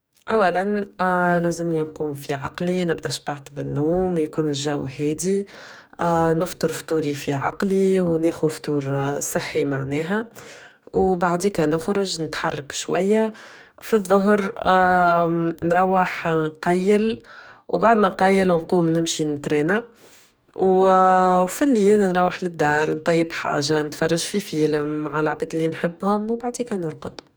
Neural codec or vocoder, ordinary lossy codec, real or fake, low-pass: codec, 44.1 kHz, 2.6 kbps, DAC; none; fake; none